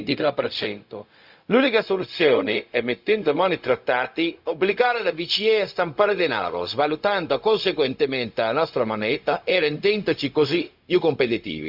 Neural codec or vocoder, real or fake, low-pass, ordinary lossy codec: codec, 16 kHz, 0.4 kbps, LongCat-Audio-Codec; fake; 5.4 kHz; Opus, 64 kbps